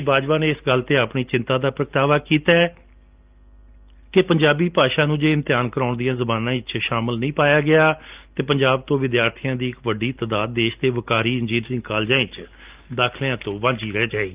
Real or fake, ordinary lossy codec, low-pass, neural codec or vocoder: real; Opus, 24 kbps; 3.6 kHz; none